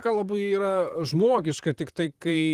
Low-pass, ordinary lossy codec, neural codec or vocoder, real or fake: 14.4 kHz; Opus, 24 kbps; vocoder, 44.1 kHz, 128 mel bands, Pupu-Vocoder; fake